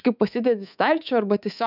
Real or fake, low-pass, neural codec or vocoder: fake; 5.4 kHz; codec, 24 kHz, 3.1 kbps, DualCodec